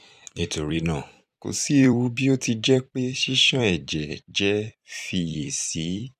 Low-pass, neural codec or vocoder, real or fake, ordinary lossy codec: 14.4 kHz; vocoder, 44.1 kHz, 128 mel bands every 256 samples, BigVGAN v2; fake; none